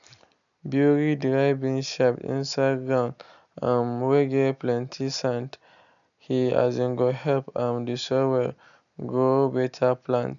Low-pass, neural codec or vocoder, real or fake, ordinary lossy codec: 7.2 kHz; none; real; none